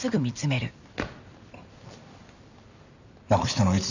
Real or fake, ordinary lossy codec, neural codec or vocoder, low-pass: fake; none; vocoder, 44.1 kHz, 128 mel bands every 256 samples, BigVGAN v2; 7.2 kHz